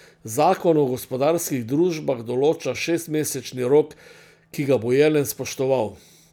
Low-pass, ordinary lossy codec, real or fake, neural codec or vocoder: 19.8 kHz; none; real; none